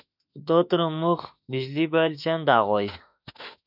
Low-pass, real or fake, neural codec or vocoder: 5.4 kHz; fake; autoencoder, 48 kHz, 32 numbers a frame, DAC-VAE, trained on Japanese speech